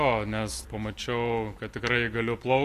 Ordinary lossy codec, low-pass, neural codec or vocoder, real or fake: AAC, 64 kbps; 14.4 kHz; none; real